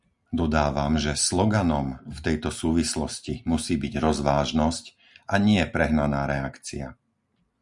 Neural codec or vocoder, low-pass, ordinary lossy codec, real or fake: none; 10.8 kHz; Opus, 64 kbps; real